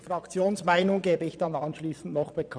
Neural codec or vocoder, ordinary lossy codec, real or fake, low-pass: vocoder, 22.05 kHz, 80 mel bands, Vocos; none; fake; 9.9 kHz